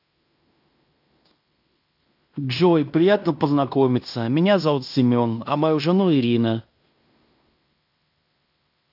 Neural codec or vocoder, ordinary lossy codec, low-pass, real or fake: codec, 16 kHz in and 24 kHz out, 0.9 kbps, LongCat-Audio-Codec, fine tuned four codebook decoder; AAC, 48 kbps; 5.4 kHz; fake